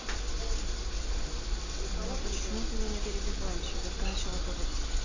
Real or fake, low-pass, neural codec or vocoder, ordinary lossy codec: real; 7.2 kHz; none; Opus, 64 kbps